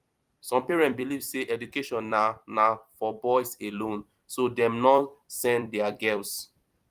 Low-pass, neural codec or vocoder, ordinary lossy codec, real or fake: 14.4 kHz; vocoder, 44.1 kHz, 128 mel bands every 256 samples, BigVGAN v2; Opus, 32 kbps; fake